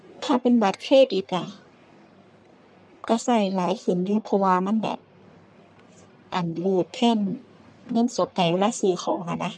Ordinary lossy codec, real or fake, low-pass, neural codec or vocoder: none; fake; 9.9 kHz; codec, 44.1 kHz, 1.7 kbps, Pupu-Codec